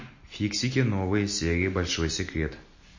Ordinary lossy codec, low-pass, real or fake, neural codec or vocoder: MP3, 32 kbps; 7.2 kHz; real; none